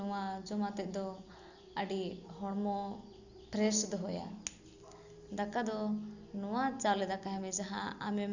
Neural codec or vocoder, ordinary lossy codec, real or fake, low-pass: none; none; real; 7.2 kHz